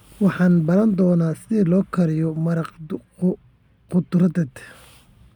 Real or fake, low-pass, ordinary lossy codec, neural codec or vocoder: real; 19.8 kHz; none; none